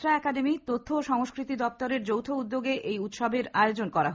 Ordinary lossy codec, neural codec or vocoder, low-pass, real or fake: none; none; none; real